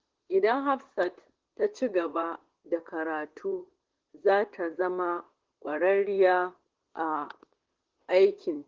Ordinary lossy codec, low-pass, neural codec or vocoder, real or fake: Opus, 16 kbps; 7.2 kHz; vocoder, 44.1 kHz, 128 mel bands, Pupu-Vocoder; fake